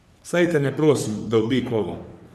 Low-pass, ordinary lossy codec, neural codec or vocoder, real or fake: 14.4 kHz; none; codec, 44.1 kHz, 3.4 kbps, Pupu-Codec; fake